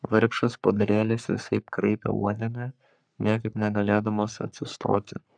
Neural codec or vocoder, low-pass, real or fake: codec, 44.1 kHz, 3.4 kbps, Pupu-Codec; 9.9 kHz; fake